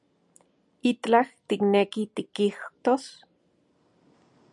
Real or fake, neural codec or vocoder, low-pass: real; none; 10.8 kHz